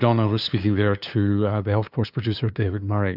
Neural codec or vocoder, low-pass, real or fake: codec, 16 kHz, 2 kbps, FunCodec, trained on LibriTTS, 25 frames a second; 5.4 kHz; fake